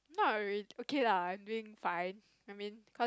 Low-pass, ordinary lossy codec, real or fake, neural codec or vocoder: none; none; real; none